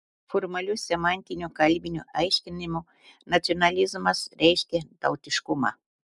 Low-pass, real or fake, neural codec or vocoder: 10.8 kHz; real; none